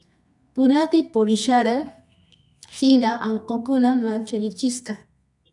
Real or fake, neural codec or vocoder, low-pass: fake; codec, 24 kHz, 0.9 kbps, WavTokenizer, medium music audio release; 10.8 kHz